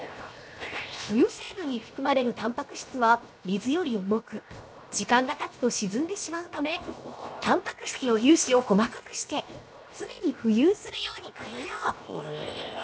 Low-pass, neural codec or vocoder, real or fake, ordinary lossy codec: none; codec, 16 kHz, 0.7 kbps, FocalCodec; fake; none